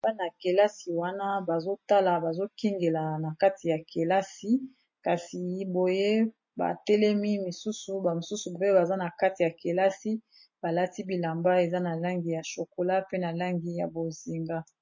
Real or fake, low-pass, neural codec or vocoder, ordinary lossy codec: real; 7.2 kHz; none; MP3, 32 kbps